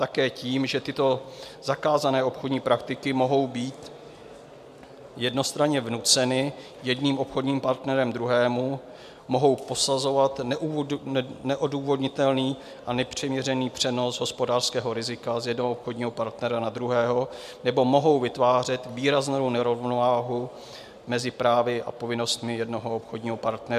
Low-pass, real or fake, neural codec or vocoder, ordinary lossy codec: 14.4 kHz; real; none; MP3, 96 kbps